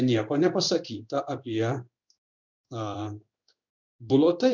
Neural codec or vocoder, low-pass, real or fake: codec, 16 kHz in and 24 kHz out, 1 kbps, XY-Tokenizer; 7.2 kHz; fake